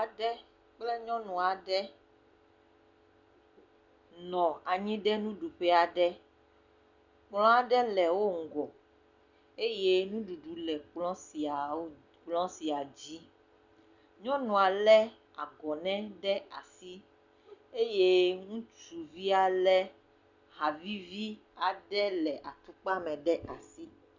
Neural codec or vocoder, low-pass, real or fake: none; 7.2 kHz; real